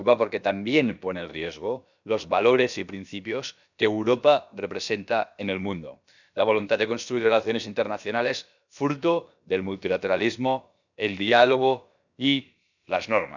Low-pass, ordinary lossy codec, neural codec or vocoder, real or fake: 7.2 kHz; none; codec, 16 kHz, about 1 kbps, DyCAST, with the encoder's durations; fake